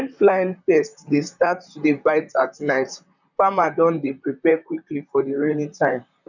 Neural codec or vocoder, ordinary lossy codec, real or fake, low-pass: vocoder, 44.1 kHz, 128 mel bands, Pupu-Vocoder; none; fake; 7.2 kHz